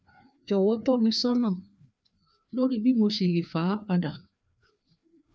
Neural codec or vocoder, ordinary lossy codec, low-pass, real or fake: codec, 16 kHz, 2 kbps, FreqCodec, larger model; none; none; fake